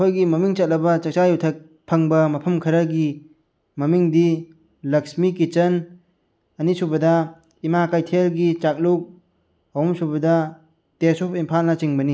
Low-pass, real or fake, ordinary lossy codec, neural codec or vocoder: none; real; none; none